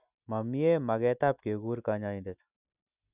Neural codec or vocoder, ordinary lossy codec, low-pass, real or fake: none; none; 3.6 kHz; real